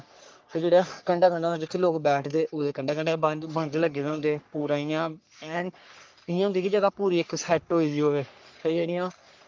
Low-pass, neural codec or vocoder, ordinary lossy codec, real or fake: 7.2 kHz; codec, 44.1 kHz, 3.4 kbps, Pupu-Codec; Opus, 24 kbps; fake